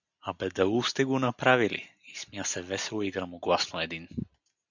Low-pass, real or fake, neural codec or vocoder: 7.2 kHz; real; none